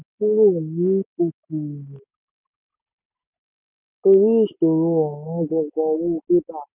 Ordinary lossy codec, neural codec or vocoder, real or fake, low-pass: none; none; real; 3.6 kHz